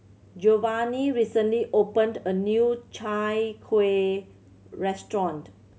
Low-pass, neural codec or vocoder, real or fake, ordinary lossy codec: none; none; real; none